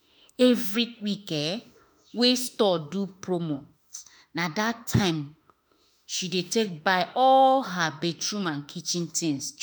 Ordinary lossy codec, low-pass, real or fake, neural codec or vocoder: none; none; fake; autoencoder, 48 kHz, 32 numbers a frame, DAC-VAE, trained on Japanese speech